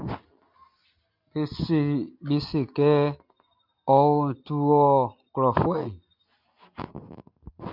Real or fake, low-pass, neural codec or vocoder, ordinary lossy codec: real; 5.4 kHz; none; MP3, 48 kbps